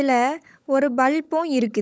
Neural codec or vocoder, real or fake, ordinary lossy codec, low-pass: codec, 16 kHz, 16 kbps, FunCodec, trained on LibriTTS, 50 frames a second; fake; none; none